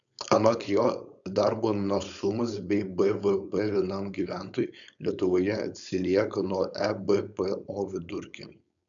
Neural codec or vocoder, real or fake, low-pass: codec, 16 kHz, 4.8 kbps, FACodec; fake; 7.2 kHz